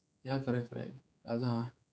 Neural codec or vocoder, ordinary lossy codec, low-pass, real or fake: codec, 16 kHz, 4 kbps, X-Codec, HuBERT features, trained on general audio; none; none; fake